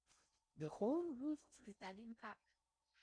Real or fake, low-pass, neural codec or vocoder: fake; 9.9 kHz; codec, 16 kHz in and 24 kHz out, 0.6 kbps, FocalCodec, streaming, 4096 codes